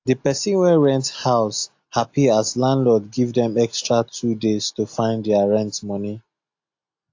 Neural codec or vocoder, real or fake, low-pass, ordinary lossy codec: none; real; 7.2 kHz; AAC, 48 kbps